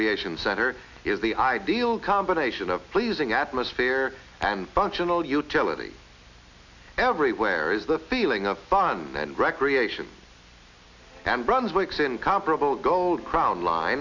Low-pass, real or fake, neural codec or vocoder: 7.2 kHz; real; none